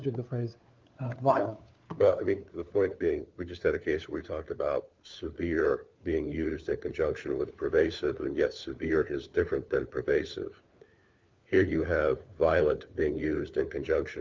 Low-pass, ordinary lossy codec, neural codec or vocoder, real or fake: 7.2 kHz; Opus, 24 kbps; codec, 16 kHz, 4 kbps, FunCodec, trained on LibriTTS, 50 frames a second; fake